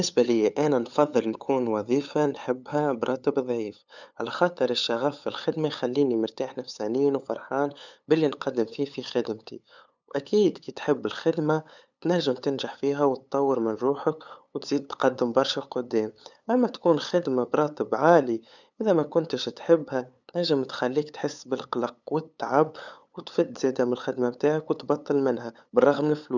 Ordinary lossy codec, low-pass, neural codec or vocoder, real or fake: none; 7.2 kHz; codec, 16 kHz, 8 kbps, FunCodec, trained on LibriTTS, 25 frames a second; fake